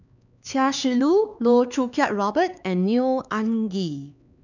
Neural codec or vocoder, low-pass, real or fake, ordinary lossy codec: codec, 16 kHz, 4 kbps, X-Codec, HuBERT features, trained on LibriSpeech; 7.2 kHz; fake; none